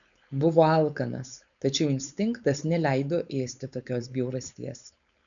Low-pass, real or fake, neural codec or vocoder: 7.2 kHz; fake; codec, 16 kHz, 4.8 kbps, FACodec